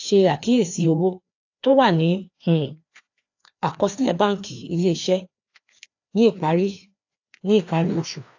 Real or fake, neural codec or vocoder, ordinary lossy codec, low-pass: fake; codec, 16 kHz, 2 kbps, FreqCodec, larger model; AAC, 48 kbps; 7.2 kHz